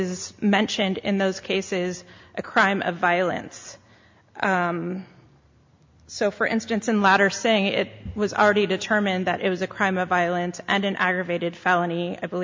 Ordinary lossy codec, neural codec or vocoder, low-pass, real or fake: MP3, 48 kbps; none; 7.2 kHz; real